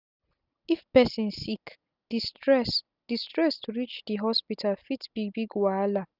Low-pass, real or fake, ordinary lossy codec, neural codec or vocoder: 5.4 kHz; real; none; none